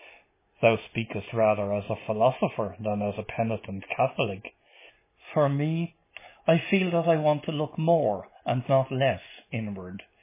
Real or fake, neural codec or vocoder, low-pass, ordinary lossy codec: real; none; 3.6 kHz; MP3, 16 kbps